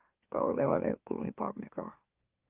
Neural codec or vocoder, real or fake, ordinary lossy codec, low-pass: autoencoder, 44.1 kHz, a latent of 192 numbers a frame, MeloTTS; fake; Opus, 32 kbps; 3.6 kHz